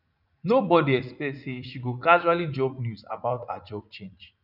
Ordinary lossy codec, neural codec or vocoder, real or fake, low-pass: none; vocoder, 44.1 kHz, 80 mel bands, Vocos; fake; 5.4 kHz